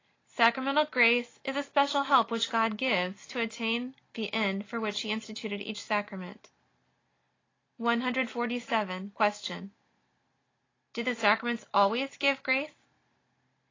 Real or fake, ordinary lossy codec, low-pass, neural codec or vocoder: real; AAC, 32 kbps; 7.2 kHz; none